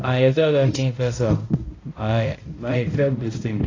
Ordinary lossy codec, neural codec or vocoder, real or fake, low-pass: AAC, 32 kbps; codec, 16 kHz, 0.5 kbps, X-Codec, HuBERT features, trained on balanced general audio; fake; 7.2 kHz